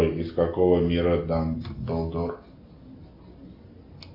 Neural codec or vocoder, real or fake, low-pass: none; real; 5.4 kHz